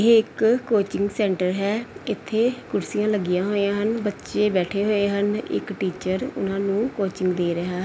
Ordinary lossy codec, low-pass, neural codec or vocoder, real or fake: none; none; none; real